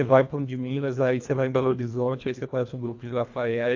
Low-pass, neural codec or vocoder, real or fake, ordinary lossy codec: 7.2 kHz; codec, 24 kHz, 1.5 kbps, HILCodec; fake; AAC, 48 kbps